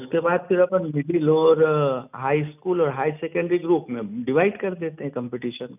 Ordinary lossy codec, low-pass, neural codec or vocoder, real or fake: none; 3.6 kHz; none; real